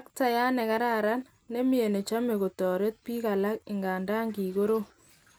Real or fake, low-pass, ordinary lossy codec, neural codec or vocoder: real; none; none; none